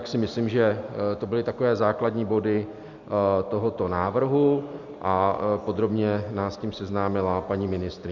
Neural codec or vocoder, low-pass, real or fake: none; 7.2 kHz; real